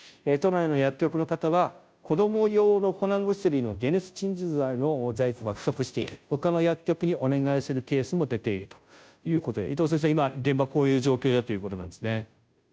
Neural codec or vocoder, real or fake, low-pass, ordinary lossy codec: codec, 16 kHz, 0.5 kbps, FunCodec, trained on Chinese and English, 25 frames a second; fake; none; none